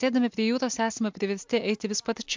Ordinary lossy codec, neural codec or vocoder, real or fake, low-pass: MP3, 64 kbps; none; real; 7.2 kHz